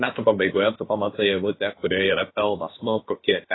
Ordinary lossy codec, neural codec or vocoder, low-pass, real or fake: AAC, 16 kbps; codec, 16 kHz, about 1 kbps, DyCAST, with the encoder's durations; 7.2 kHz; fake